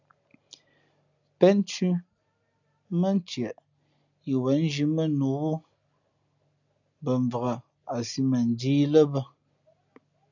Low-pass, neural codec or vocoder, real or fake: 7.2 kHz; none; real